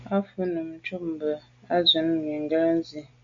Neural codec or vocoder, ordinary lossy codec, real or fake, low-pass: none; MP3, 48 kbps; real; 7.2 kHz